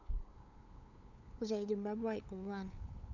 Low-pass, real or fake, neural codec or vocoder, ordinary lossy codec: 7.2 kHz; fake; codec, 16 kHz, 8 kbps, FunCodec, trained on LibriTTS, 25 frames a second; none